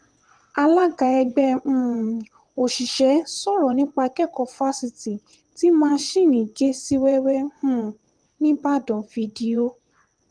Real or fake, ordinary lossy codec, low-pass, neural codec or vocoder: fake; Opus, 24 kbps; 9.9 kHz; vocoder, 22.05 kHz, 80 mel bands, WaveNeXt